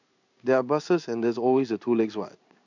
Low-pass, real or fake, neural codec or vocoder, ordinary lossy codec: 7.2 kHz; fake; codec, 16 kHz in and 24 kHz out, 1 kbps, XY-Tokenizer; none